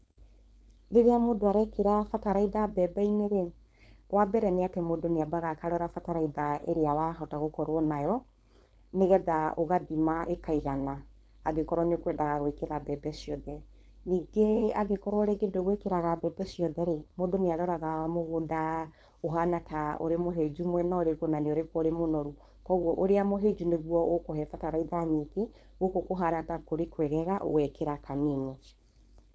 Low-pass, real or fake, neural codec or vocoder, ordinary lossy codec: none; fake; codec, 16 kHz, 4.8 kbps, FACodec; none